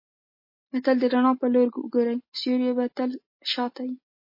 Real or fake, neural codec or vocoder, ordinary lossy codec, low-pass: real; none; MP3, 24 kbps; 5.4 kHz